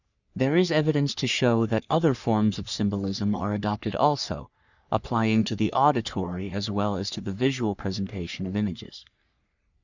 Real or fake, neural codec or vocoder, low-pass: fake; codec, 44.1 kHz, 3.4 kbps, Pupu-Codec; 7.2 kHz